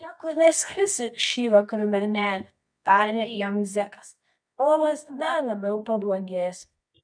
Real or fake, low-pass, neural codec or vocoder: fake; 9.9 kHz; codec, 24 kHz, 0.9 kbps, WavTokenizer, medium music audio release